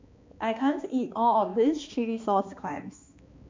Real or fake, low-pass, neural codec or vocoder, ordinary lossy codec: fake; 7.2 kHz; codec, 16 kHz, 2 kbps, X-Codec, HuBERT features, trained on balanced general audio; MP3, 64 kbps